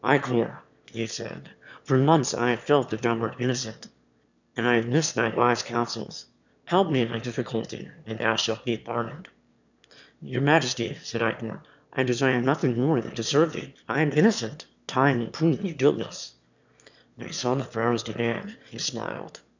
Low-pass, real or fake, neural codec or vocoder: 7.2 kHz; fake; autoencoder, 22.05 kHz, a latent of 192 numbers a frame, VITS, trained on one speaker